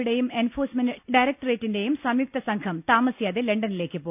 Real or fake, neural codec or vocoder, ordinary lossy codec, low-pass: real; none; none; 3.6 kHz